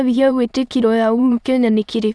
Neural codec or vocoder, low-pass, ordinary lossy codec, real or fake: autoencoder, 22.05 kHz, a latent of 192 numbers a frame, VITS, trained on many speakers; none; none; fake